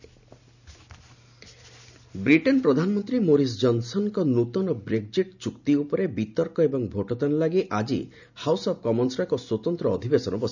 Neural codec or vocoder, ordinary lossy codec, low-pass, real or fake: none; none; 7.2 kHz; real